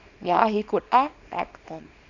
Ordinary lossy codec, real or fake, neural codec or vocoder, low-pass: none; fake; codec, 24 kHz, 0.9 kbps, WavTokenizer, small release; 7.2 kHz